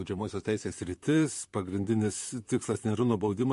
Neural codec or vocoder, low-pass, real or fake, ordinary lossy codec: vocoder, 44.1 kHz, 128 mel bands, Pupu-Vocoder; 14.4 kHz; fake; MP3, 48 kbps